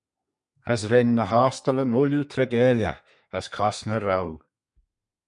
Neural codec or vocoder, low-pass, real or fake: codec, 32 kHz, 1.9 kbps, SNAC; 10.8 kHz; fake